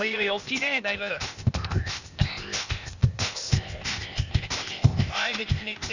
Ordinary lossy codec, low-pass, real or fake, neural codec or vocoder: none; 7.2 kHz; fake; codec, 16 kHz, 0.8 kbps, ZipCodec